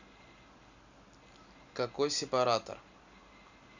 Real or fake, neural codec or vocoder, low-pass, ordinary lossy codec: real; none; 7.2 kHz; none